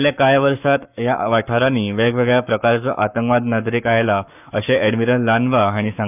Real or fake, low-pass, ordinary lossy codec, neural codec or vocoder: fake; 3.6 kHz; none; codec, 44.1 kHz, 7.8 kbps, Pupu-Codec